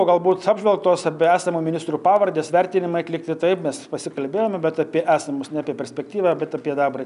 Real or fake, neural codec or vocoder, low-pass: real; none; 10.8 kHz